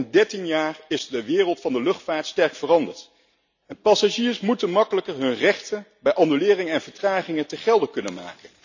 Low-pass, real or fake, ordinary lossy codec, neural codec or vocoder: 7.2 kHz; real; none; none